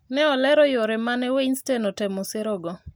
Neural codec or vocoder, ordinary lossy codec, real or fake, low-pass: none; none; real; none